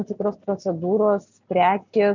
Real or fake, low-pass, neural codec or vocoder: real; 7.2 kHz; none